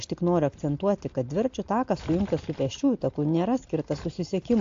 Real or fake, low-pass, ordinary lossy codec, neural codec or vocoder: real; 7.2 kHz; AAC, 48 kbps; none